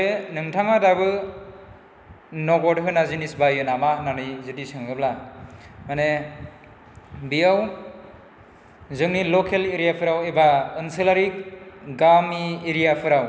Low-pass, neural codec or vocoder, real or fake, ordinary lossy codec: none; none; real; none